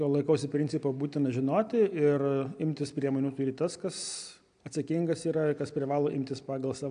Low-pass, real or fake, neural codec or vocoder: 9.9 kHz; real; none